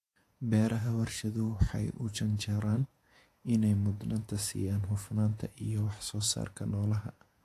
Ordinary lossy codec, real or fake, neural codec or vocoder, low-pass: AAC, 64 kbps; fake; vocoder, 48 kHz, 128 mel bands, Vocos; 14.4 kHz